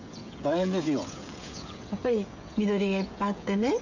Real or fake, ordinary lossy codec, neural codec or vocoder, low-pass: fake; none; codec, 16 kHz, 16 kbps, FreqCodec, smaller model; 7.2 kHz